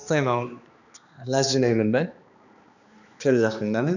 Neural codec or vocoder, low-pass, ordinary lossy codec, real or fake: codec, 16 kHz, 2 kbps, X-Codec, HuBERT features, trained on balanced general audio; 7.2 kHz; none; fake